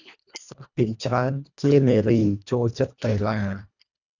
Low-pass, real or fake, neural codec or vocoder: 7.2 kHz; fake; codec, 24 kHz, 1.5 kbps, HILCodec